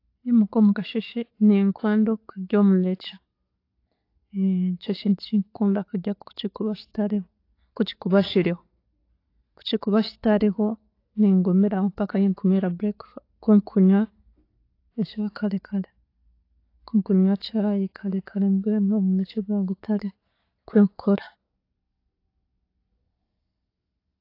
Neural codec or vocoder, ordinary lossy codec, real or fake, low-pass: none; AAC, 32 kbps; real; 5.4 kHz